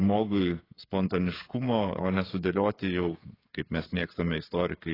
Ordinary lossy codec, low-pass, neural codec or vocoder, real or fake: AAC, 24 kbps; 5.4 kHz; codec, 16 kHz, 8 kbps, FreqCodec, smaller model; fake